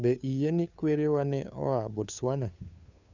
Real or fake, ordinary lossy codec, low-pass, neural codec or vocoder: fake; none; 7.2 kHz; codec, 16 kHz, 2 kbps, FunCodec, trained on Chinese and English, 25 frames a second